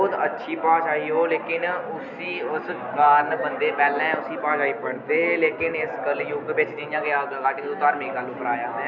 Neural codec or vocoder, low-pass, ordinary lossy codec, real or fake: none; 7.2 kHz; none; real